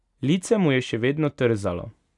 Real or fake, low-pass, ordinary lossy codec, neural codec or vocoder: real; 10.8 kHz; none; none